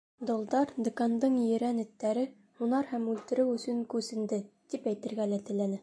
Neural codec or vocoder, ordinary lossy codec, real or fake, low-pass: none; MP3, 64 kbps; real; 9.9 kHz